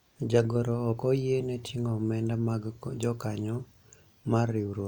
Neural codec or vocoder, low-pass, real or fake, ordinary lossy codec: none; 19.8 kHz; real; none